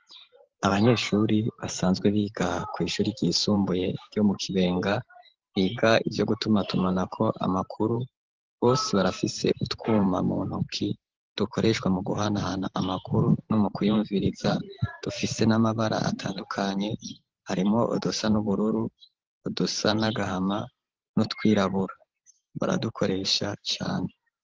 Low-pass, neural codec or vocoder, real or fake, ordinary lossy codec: 7.2 kHz; vocoder, 44.1 kHz, 128 mel bands every 512 samples, BigVGAN v2; fake; Opus, 16 kbps